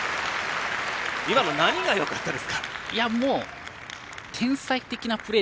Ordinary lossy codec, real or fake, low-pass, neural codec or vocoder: none; real; none; none